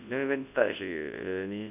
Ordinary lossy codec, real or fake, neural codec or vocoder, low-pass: AAC, 32 kbps; fake; codec, 24 kHz, 0.9 kbps, WavTokenizer, large speech release; 3.6 kHz